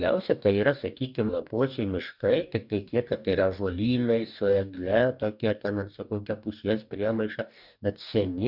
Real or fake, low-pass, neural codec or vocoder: fake; 5.4 kHz; codec, 44.1 kHz, 2.6 kbps, DAC